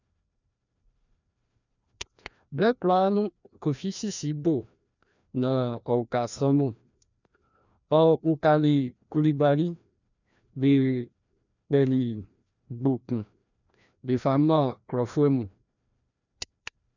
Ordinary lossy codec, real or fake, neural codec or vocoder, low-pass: none; fake; codec, 16 kHz, 1 kbps, FreqCodec, larger model; 7.2 kHz